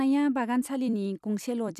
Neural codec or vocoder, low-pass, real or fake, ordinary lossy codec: vocoder, 44.1 kHz, 128 mel bands every 256 samples, BigVGAN v2; 14.4 kHz; fake; none